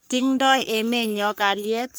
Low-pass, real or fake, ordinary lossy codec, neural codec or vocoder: none; fake; none; codec, 44.1 kHz, 3.4 kbps, Pupu-Codec